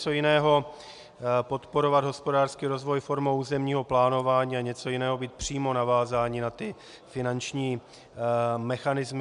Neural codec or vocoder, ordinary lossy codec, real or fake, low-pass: none; MP3, 96 kbps; real; 10.8 kHz